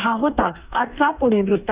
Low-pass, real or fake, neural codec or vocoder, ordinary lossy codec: 3.6 kHz; fake; codec, 44.1 kHz, 2.6 kbps, DAC; Opus, 64 kbps